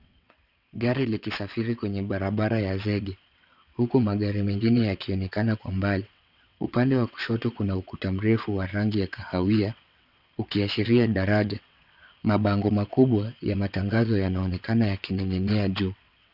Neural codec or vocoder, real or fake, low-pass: vocoder, 22.05 kHz, 80 mel bands, WaveNeXt; fake; 5.4 kHz